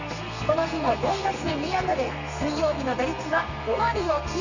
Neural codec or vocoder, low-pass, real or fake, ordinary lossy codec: codec, 32 kHz, 1.9 kbps, SNAC; 7.2 kHz; fake; none